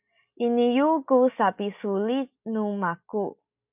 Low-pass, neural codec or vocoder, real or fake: 3.6 kHz; none; real